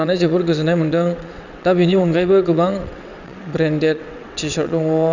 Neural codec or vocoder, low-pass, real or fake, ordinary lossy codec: vocoder, 22.05 kHz, 80 mel bands, WaveNeXt; 7.2 kHz; fake; none